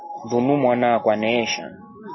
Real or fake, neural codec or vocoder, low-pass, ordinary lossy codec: real; none; 7.2 kHz; MP3, 24 kbps